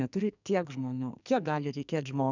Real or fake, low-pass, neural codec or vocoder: fake; 7.2 kHz; codec, 44.1 kHz, 2.6 kbps, SNAC